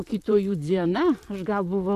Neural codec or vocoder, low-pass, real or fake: vocoder, 44.1 kHz, 128 mel bands, Pupu-Vocoder; 14.4 kHz; fake